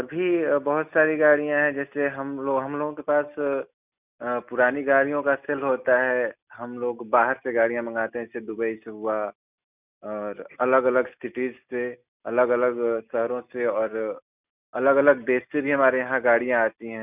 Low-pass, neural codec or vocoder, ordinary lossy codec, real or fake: 3.6 kHz; none; none; real